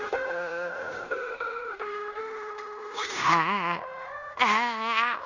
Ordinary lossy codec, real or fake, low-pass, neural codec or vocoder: none; fake; 7.2 kHz; codec, 16 kHz in and 24 kHz out, 0.9 kbps, LongCat-Audio-Codec, fine tuned four codebook decoder